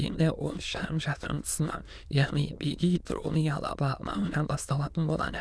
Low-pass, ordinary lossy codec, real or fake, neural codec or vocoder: none; none; fake; autoencoder, 22.05 kHz, a latent of 192 numbers a frame, VITS, trained on many speakers